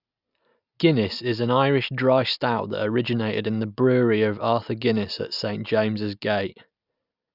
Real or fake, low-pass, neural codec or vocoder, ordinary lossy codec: real; 5.4 kHz; none; none